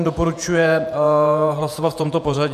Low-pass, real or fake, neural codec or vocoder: 14.4 kHz; fake; vocoder, 48 kHz, 128 mel bands, Vocos